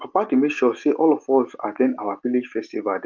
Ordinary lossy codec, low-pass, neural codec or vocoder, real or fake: Opus, 32 kbps; 7.2 kHz; none; real